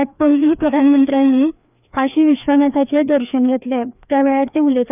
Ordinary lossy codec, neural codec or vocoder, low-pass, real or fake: none; codec, 16 kHz, 2 kbps, FreqCodec, larger model; 3.6 kHz; fake